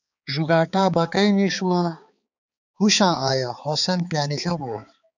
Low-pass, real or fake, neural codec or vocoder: 7.2 kHz; fake; codec, 16 kHz, 4 kbps, X-Codec, HuBERT features, trained on balanced general audio